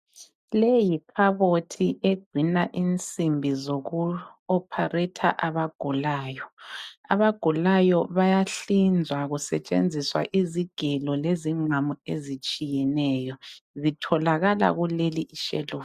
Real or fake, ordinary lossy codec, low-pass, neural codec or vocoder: real; MP3, 96 kbps; 14.4 kHz; none